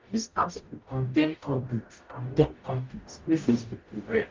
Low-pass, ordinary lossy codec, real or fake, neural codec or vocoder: 7.2 kHz; Opus, 24 kbps; fake; codec, 44.1 kHz, 0.9 kbps, DAC